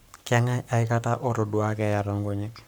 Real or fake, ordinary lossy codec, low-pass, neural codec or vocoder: fake; none; none; codec, 44.1 kHz, 7.8 kbps, Pupu-Codec